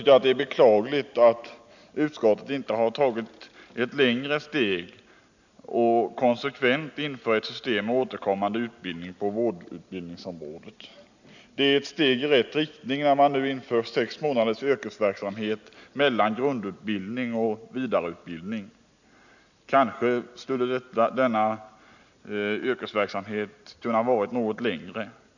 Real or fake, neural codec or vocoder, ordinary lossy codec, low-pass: real; none; none; 7.2 kHz